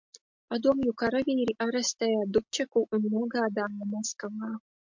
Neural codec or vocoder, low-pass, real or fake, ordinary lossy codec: none; 7.2 kHz; real; MP3, 48 kbps